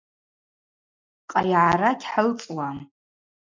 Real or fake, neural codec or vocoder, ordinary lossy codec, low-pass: real; none; MP3, 64 kbps; 7.2 kHz